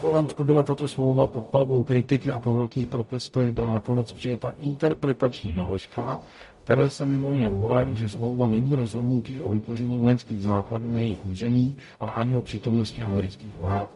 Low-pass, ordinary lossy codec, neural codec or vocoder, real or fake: 14.4 kHz; MP3, 48 kbps; codec, 44.1 kHz, 0.9 kbps, DAC; fake